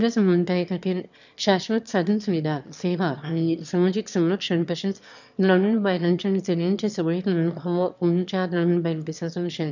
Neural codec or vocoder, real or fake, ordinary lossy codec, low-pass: autoencoder, 22.05 kHz, a latent of 192 numbers a frame, VITS, trained on one speaker; fake; none; 7.2 kHz